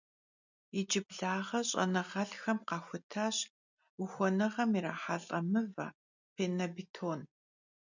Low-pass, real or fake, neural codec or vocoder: 7.2 kHz; real; none